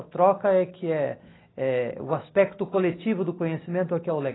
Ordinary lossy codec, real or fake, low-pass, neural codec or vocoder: AAC, 16 kbps; real; 7.2 kHz; none